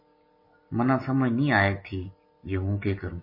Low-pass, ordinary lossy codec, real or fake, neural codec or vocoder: 5.4 kHz; MP3, 24 kbps; real; none